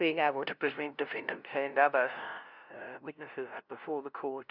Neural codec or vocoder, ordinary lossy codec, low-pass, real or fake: codec, 16 kHz, 0.5 kbps, FunCodec, trained on LibriTTS, 25 frames a second; Opus, 64 kbps; 5.4 kHz; fake